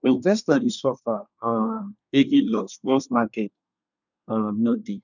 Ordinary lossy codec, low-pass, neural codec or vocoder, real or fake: none; 7.2 kHz; codec, 24 kHz, 1 kbps, SNAC; fake